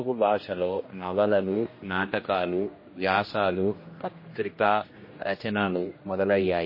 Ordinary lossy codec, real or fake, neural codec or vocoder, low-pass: MP3, 24 kbps; fake; codec, 16 kHz, 1 kbps, X-Codec, HuBERT features, trained on general audio; 5.4 kHz